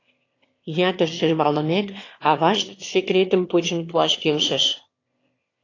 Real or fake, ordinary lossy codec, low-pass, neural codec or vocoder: fake; AAC, 32 kbps; 7.2 kHz; autoencoder, 22.05 kHz, a latent of 192 numbers a frame, VITS, trained on one speaker